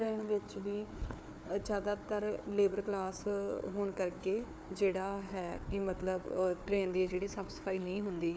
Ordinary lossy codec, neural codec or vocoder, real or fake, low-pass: none; codec, 16 kHz, 4 kbps, FunCodec, trained on Chinese and English, 50 frames a second; fake; none